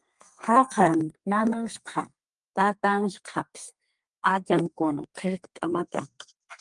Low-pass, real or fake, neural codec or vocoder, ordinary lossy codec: 10.8 kHz; fake; codec, 32 kHz, 1.9 kbps, SNAC; Opus, 32 kbps